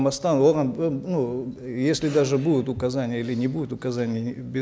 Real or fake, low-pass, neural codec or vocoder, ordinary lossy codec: real; none; none; none